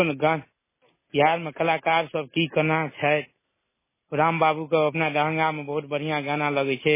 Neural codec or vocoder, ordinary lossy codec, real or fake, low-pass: none; MP3, 16 kbps; real; 3.6 kHz